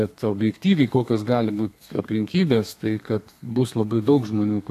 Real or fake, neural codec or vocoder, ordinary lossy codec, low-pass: fake; codec, 32 kHz, 1.9 kbps, SNAC; AAC, 64 kbps; 14.4 kHz